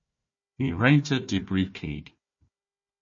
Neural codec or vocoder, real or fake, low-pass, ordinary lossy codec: codec, 16 kHz, 1 kbps, FunCodec, trained on Chinese and English, 50 frames a second; fake; 7.2 kHz; MP3, 32 kbps